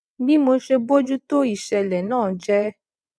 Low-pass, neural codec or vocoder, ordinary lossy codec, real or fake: none; vocoder, 22.05 kHz, 80 mel bands, WaveNeXt; none; fake